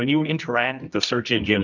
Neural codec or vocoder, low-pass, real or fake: codec, 24 kHz, 0.9 kbps, WavTokenizer, medium music audio release; 7.2 kHz; fake